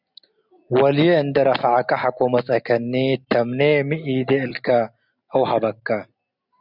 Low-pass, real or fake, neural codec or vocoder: 5.4 kHz; real; none